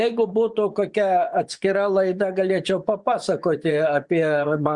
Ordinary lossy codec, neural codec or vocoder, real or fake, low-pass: Opus, 64 kbps; vocoder, 24 kHz, 100 mel bands, Vocos; fake; 10.8 kHz